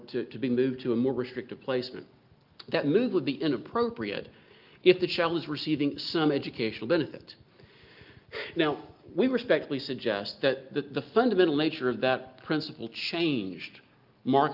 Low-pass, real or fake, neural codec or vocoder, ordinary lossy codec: 5.4 kHz; real; none; Opus, 24 kbps